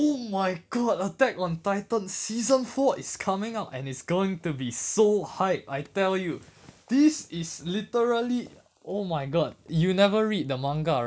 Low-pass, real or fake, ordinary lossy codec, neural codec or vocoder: none; real; none; none